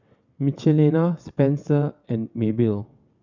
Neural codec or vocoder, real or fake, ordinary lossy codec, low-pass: vocoder, 22.05 kHz, 80 mel bands, WaveNeXt; fake; none; 7.2 kHz